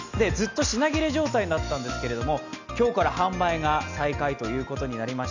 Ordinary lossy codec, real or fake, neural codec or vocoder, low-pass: none; real; none; 7.2 kHz